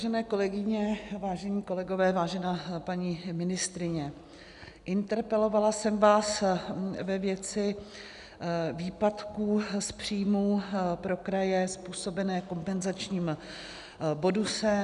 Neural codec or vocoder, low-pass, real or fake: none; 10.8 kHz; real